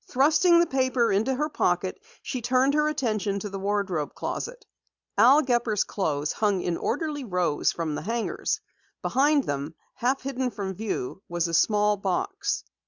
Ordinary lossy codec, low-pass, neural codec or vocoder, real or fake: Opus, 64 kbps; 7.2 kHz; none; real